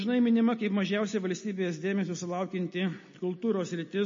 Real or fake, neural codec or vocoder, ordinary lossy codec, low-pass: real; none; MP3, 32 kbps; 7.2 kHz